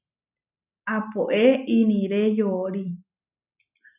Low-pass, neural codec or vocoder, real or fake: 3.6 kHz; none; real